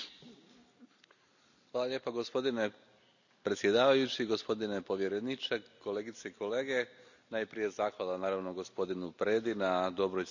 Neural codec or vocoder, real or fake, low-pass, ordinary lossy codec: none; real; 7.2 kHz; none